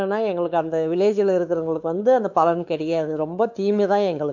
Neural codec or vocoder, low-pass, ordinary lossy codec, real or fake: codec, 16 kHz, 4 kbps, X-Codec, WavLM features, trained on Multilingual LibriSpeech; 7.2 kHz; none; fake